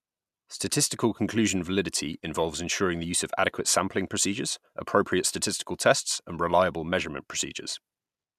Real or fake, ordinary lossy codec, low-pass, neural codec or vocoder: real; MP3, 96 kbps; 14.4 kHz; none